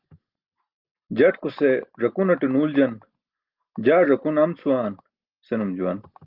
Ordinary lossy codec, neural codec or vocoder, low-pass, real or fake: Opus, 32 kbps; none; 5.4 kHz; real